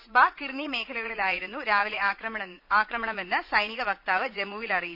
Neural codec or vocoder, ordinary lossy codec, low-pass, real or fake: vocoder, 44.1 kHz, 80 mel bands, Vocos; none; 5.4 kHz; fake